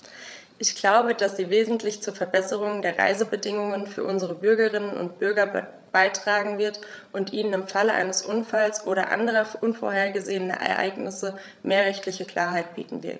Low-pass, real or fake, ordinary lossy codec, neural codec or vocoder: none; fake; none; codec, 16 kHz, 8 kbps, FreqCodec, larger model